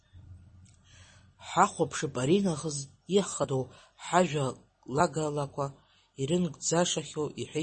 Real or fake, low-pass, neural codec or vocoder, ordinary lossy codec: real; 10.8 kHz; none; MP3, 32 kbps